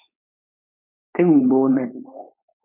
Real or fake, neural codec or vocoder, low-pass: fake; codec, 16 kHz, 4.8 kbps, FACodec; 3.6 kHz